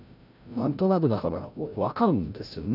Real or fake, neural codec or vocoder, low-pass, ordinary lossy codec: fake; codec, 16 kHz, 0.5 kbps, FreqCodec, larger model; 5.4 kHz; none